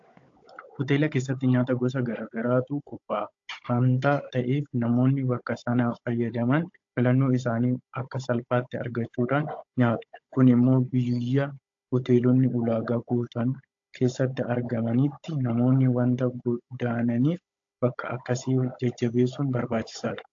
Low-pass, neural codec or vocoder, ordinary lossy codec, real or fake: 7.2 kHz; codec, 16 kHz, 16 kbps, FunCodec, trained on Chinese and English, 50 frames a second; AAC, 48 kbps; fake